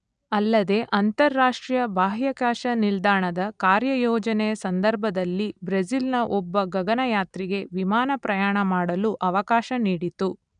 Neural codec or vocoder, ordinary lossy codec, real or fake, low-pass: none; none; real; 9.9 kHz